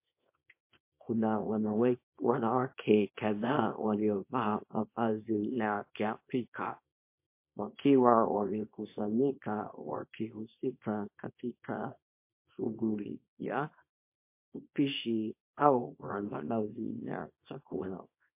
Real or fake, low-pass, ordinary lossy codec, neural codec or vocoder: fake; 3.6 kHz; MP3, 24 kbps; codec, 24 kHz, 0.9 kbps, WavTokenizer, small release